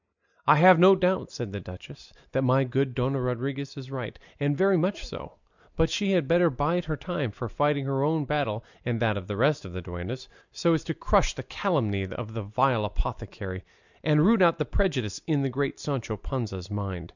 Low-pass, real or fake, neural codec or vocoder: 7.2 kHz; real; none